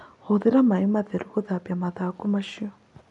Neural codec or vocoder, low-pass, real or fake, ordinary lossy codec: none; 10.8 kHz; real; none